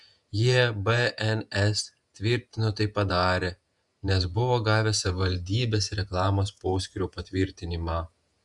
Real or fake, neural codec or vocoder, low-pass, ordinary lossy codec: fake; vocoder, 48 kHz, 128 mel bands, Vocos; 10.8 kHz; Opus, 64 kbps